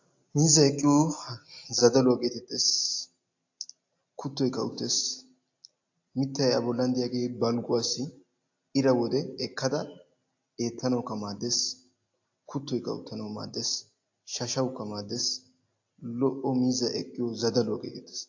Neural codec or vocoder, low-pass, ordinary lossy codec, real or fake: none; 7.2 kHz; AAC, 48 kbps; real